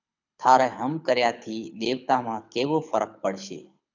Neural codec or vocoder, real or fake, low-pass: codec, 24 kHz, 6 kbps, HILCodec; fake; 7.2 kHz